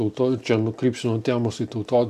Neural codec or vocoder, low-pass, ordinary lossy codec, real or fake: none; 14.4 kHz; AAC, 96 kbps; real